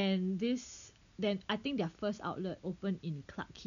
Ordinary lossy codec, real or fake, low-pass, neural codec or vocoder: none; real; 7.2 kHz; none